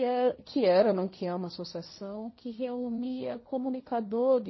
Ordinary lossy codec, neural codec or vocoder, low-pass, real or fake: MP3, 24 kbps; codec, 16 kHz, 1.1 kbps, Voila-Tokenizer; 7.2 kHz; fake